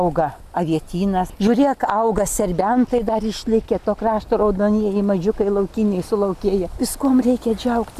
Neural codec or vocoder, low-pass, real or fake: none; 14.4 kHz; real